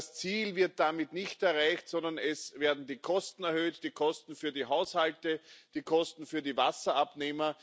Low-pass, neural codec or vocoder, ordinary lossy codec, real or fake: none; none; none; real